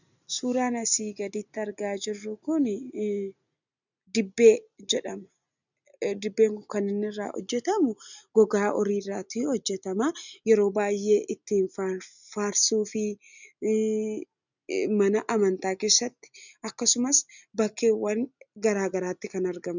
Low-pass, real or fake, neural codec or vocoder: 7.2 kHz; real; none